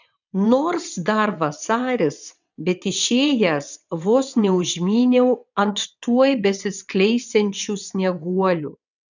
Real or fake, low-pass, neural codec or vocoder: fake; 7.2 kHz; vocoder, 22.05 kHz, 80 mel bands, WaveNeXt